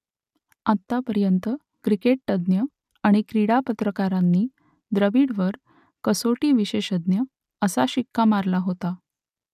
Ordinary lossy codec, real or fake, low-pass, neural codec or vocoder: none; real; 14.4 kHz; none